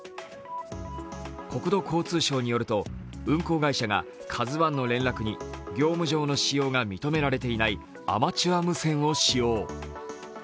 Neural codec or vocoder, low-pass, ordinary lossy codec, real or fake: none; none; none; real